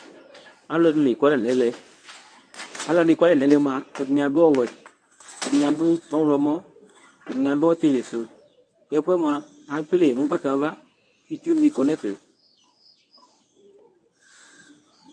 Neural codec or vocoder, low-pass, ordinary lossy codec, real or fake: codec, 24 kHz, 0.9 kbps, WavTokenizer, medium speech release version 1; 9.9 kHz; AAC, 64 kbps; fake